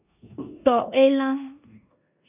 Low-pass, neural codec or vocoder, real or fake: 3.6 kHz; codec, 16 kHz in and 24 kHz out, 0.9 kbps, LongCat-Audio-Codec, four codebook decoder; fake